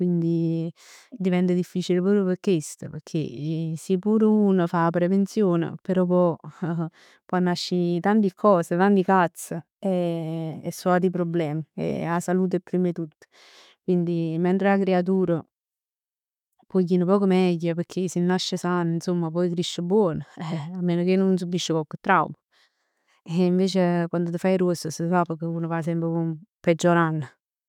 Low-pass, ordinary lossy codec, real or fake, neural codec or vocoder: 19.8 kHz; none; fake; autoencoder, 48 kHz, 128 numbers a frame, DAC-VAE, trained on Japanese speech